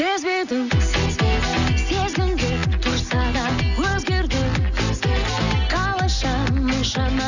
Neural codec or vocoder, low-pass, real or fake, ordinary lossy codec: none; 7.2 kHz; real; none